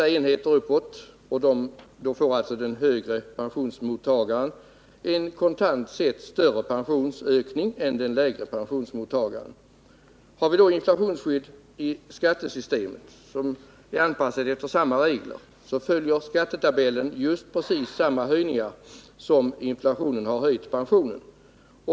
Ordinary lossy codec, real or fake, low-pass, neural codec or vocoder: none; real; none; none